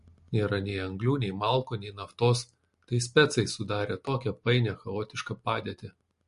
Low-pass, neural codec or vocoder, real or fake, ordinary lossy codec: 10.8 kHz; none; real; MP3, 48 kbps